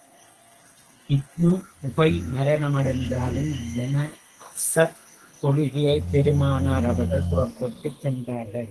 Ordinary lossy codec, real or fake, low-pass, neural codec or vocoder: Opus, 16 kbps; fake; 10.8 kHz; codec, 32 kHz, 1.9 kbps, SNAC